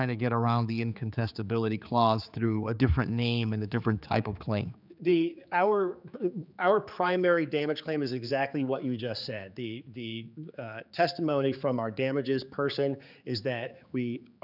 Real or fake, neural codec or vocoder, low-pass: fake; codec, 16 kHz, 4 kbps, X-Codec, HuBERT features, trained on general audio; 5.4 kHz